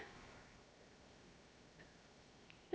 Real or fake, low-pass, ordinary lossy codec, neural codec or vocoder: fake; none; none; codec, 16 kHz, 0.7 kbps, FocalCodec